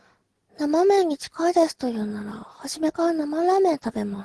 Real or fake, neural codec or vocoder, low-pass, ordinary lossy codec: real; none; 10.8 kHz; Opus, 16 kbps